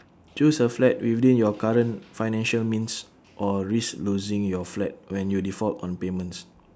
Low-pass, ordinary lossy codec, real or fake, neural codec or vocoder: none; none; real; none